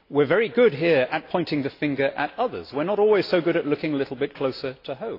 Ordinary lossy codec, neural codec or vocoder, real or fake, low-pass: AAC, 32 kbps; none; real; 5.4 kHz